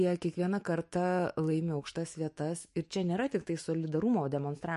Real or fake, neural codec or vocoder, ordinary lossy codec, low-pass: fake; autoencoder, 48 kHz, 128 numbers a frame, DAC-VAE, trained on Japanese speech; MP3, 48 kbps; 14.4 kHz